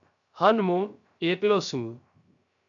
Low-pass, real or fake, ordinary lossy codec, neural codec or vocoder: 7.2 kHz; fake; MP3, 96 kbps; codec, 16 kHz, 0.3 kbps, FocalCodec